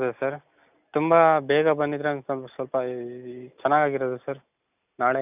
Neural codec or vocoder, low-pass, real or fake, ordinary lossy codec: none; 3.6 kHz; real; none